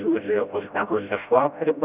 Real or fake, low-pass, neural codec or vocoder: fake; 3.6 kHz; codec, 16 kHz, 0.5 kbps, FreqCodec, smaller model